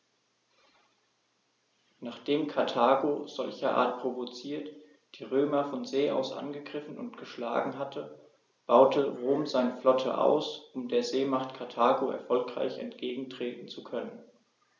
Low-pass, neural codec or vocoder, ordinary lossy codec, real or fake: 7.2 kHz; none; none; real